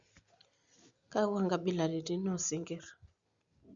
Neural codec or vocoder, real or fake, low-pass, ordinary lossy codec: none; real; 7.2 kHz; Opus, 64 kbps